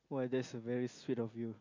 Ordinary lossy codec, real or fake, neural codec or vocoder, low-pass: MP3, 48 kbps; real; none; 7.2 kHz